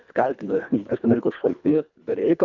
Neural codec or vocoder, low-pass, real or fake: codec, 24 kHz, 1.5 kbps, HILCodec; 7.2 kHz; fake